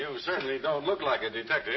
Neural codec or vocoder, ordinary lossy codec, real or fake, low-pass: none; MP3, 24 kbps; real; 7.2 kHz